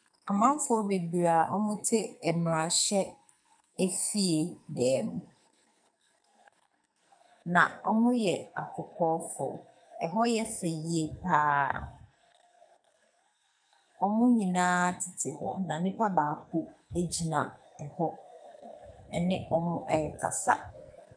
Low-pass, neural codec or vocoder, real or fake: 9.9 kHz; codec, 44.1 kHz, 2.6 kbps, SNAC; fake